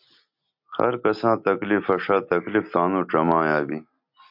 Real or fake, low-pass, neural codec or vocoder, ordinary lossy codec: real; 5.4 kHz; none; MP3, 48 kbps